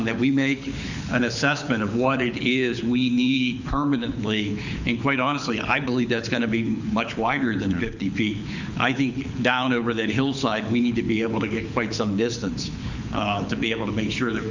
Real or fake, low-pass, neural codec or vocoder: fake; 7.2 kHz; codec, 24 kHz, 6 kbps, HILCodec